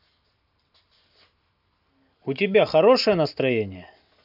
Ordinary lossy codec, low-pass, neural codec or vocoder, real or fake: none; 5.4 kHz; none; real